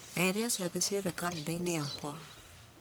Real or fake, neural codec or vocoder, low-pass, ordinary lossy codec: fake; codec, 44.1 kHz, 1.7 kbps, Pupu-Codec; none; none